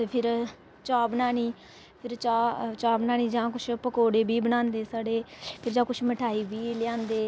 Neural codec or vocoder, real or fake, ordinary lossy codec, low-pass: none; real; none; none